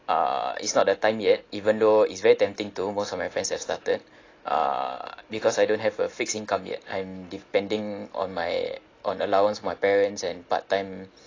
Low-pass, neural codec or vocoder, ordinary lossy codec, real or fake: 7.2 kHz; none; AAC, 32 kbps; real